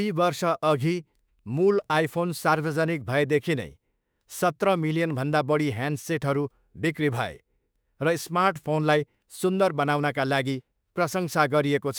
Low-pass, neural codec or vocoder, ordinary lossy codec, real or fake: none; autoencoder, 48 kHz, 32 numbers a frame, DAC-VAE, trained on Japanese speech; none; fake